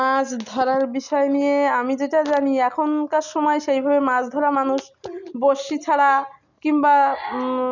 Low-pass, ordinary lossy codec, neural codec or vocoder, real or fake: 7.2 kHz; none; none; real